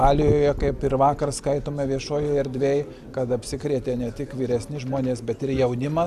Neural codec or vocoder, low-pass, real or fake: none; 14.4 kHz; real